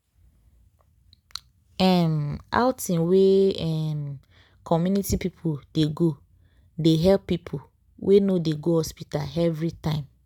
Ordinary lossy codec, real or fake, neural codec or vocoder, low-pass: none; real; none; 19.8 kHz